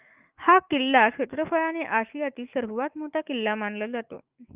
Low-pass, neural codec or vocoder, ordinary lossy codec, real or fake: 3.6 kHz; autoencoder, 48 kHz, 128 numbers a frame, DAC-VAE, trained on Japanese speech; Opus, 32 kbps; fake